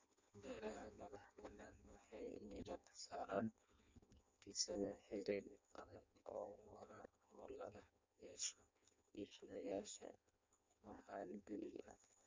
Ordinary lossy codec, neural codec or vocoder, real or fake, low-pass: MP3, 64 kbps; codec, 16 kHz in and 24 kHz out, 0.6 kbps, FireRedTTS-2 codec; fake; 7.2 kHz